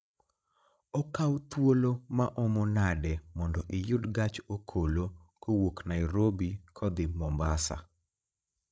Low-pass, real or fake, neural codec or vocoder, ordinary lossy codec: none; fake; codec, 16 kHz, 16 kbps, FreqCodec, larger model; none